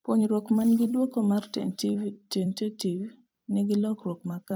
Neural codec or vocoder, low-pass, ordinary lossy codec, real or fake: vocoder, 44.1 kHz, 128 mel bands every 256 samples, BigVGAN v2; none; none; fake